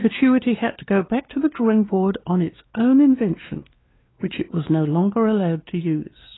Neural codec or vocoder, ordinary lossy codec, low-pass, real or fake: codec, 16 kHz, 2 kbps, X-Codec, WavLM features, trained on Multilingual LibriSpeech; AAC, 16 kbps; 7.2 kHz; fake